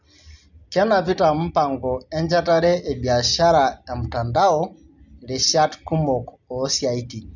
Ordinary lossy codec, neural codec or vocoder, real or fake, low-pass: none; none; real; 7.2 kHz